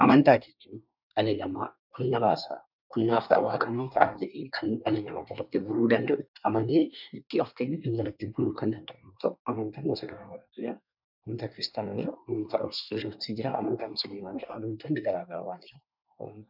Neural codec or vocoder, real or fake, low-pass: codec, 24 kHz, 1 kbps, SNAC; fake; 5.4 kHz